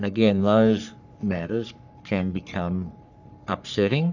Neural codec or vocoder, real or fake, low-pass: codec, 44.1 kHz, 3.4 kbps, Pupu-Codec; fake; 7.2 kHz